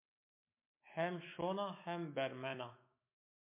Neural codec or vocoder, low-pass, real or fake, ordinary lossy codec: autoencoder, 48 kHz, 128 numbers a frame, DAC-VAE, trained on Japanese speech; 3.6 kHz; fake; MP3, 24 kbps